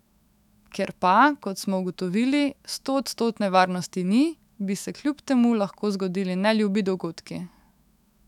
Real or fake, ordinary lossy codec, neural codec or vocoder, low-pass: fake; none; autoencoder, 48 kHz, 128 numbers a frame, DAC-VAE, trained on Japanese speech; 19.8 kHz